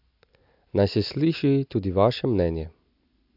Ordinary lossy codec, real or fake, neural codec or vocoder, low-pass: AAC, 48 kbps; real; none; 5.4 kHz